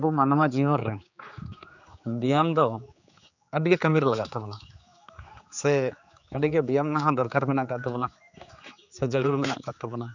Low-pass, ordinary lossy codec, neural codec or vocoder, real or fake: 7.2 kHz; none; codec, 16 kHz, 4 kbps, X-Codec, HuBERT features, trained on general audio; fake